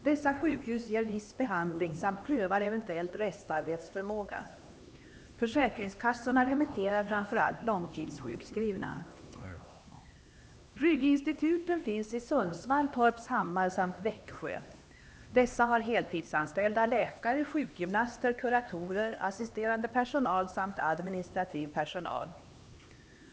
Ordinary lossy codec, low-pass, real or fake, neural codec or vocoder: none; none; fake; codec, 16 kHz, 2 kbps, X-Codec, HuBERT features, trained on LibriSpeech